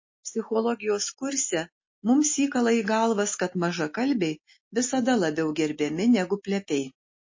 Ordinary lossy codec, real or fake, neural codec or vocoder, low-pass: MP3, 32 kbps; real; none; 7.2 kHz